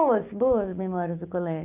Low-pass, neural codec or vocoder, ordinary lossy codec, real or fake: 3.6 kHz; codec, 16 kHz, 6 kbps, DAC; none; fake